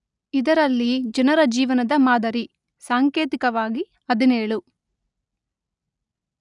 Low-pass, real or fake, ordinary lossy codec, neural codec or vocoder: 10.8 kHz; real; none; none